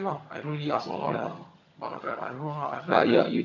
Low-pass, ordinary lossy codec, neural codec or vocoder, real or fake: 7.2 kHz; none; vocoder, 22.05 kHz, 80 mel bands, HiFi-GAN; fake